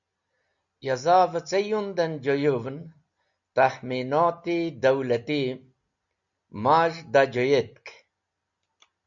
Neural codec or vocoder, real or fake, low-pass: none; real; 7.2 kHz